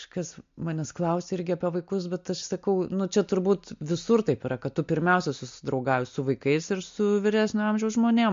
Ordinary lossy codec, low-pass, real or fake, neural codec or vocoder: MP3, 48 kbps; 7.2 kHz; real; none